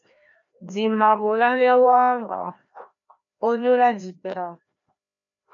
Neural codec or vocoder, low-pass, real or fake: codec, 16 kHz, 1 kbps, FreqCodec, larger model; 7.2 kHz; fake